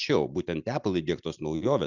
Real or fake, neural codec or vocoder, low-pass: real; none; 7.2 kHz